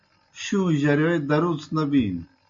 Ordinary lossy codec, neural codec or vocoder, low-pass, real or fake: MP3, 48 kbps; none; 7.2 kHz; real